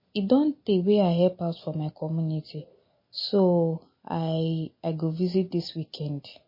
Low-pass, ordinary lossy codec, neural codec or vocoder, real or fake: 5.4 kHz; MP3, 24 kbps; none; real